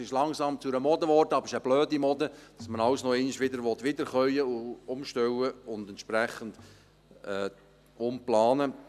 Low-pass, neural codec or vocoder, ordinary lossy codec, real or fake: 14.4 kHz; none; none; real